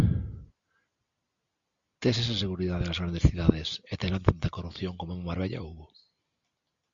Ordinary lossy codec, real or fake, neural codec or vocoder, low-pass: Opus, 64 kbps; real; none; 7.2 kHz